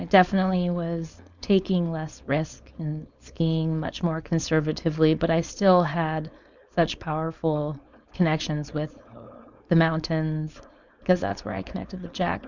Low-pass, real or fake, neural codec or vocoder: 7.2 kHz; fake; codec, 16 kHz, 4.8 kbps, FACodec